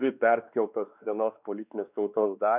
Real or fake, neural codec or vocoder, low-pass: fake; codec, 16 kHz, 2 kbps, X-Codec, WavLM features, trained on Multilingual LibriSpeech; 3.6 kHz